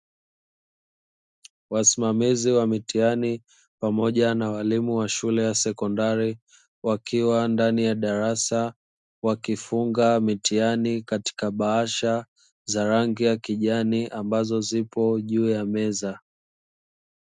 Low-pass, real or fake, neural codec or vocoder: 10.8 kHz; real; none